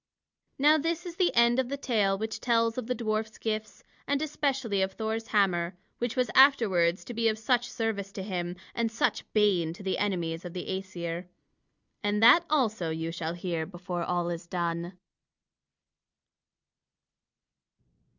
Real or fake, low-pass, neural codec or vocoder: real; 7.2 kHz; none